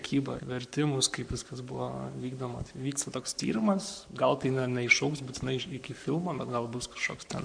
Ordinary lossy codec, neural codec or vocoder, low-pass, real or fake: MP3, 96 kbps; codec, 44.1 kHz, 7.8 kbps, Pupu-Codec; 9.9 kHz; fake